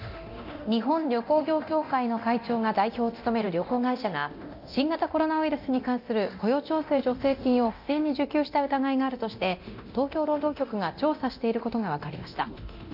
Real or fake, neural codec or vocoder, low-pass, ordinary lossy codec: fake; codec, 24 kHz, 0.9 kbps, DualCodec; 5.4 kHz; AAC, 48 kbps